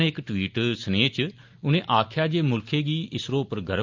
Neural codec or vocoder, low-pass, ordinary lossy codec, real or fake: none; 7.2 kHz; Opus, 32 kbps; real